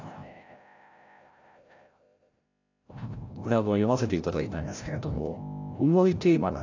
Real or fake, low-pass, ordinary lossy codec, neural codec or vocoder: fake; 7.2 kHz; AAC, 48 kbps; codec, 16 kHz, 0.5 kbps, FreqCodec, larger model